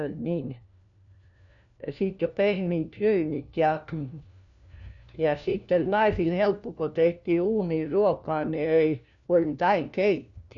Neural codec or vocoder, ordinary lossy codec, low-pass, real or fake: codec, 16 kHz, 1 kbps, FunCodec, trained on LibriTTS, 50 frames a second; none; 7.2 kHz; fake